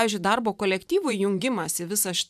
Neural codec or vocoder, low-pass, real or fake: vocoder, 44.1 kHz, 128 mel bands every 512 samples, BigVGAN v2; 14.4 kHz; fake